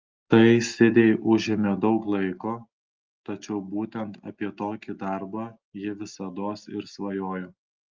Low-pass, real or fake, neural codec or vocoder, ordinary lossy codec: 7.2 kHz; real; none; Opus, 24 kbps